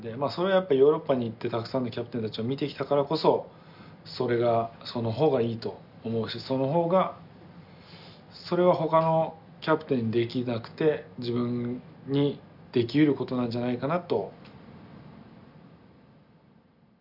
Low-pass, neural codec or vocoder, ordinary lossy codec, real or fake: 5.4 kHz; none; none; real